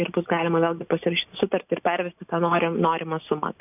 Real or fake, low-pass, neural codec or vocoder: real; 3.6 kHz; none